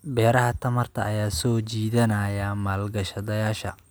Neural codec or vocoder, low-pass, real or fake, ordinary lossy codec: none; none; real; none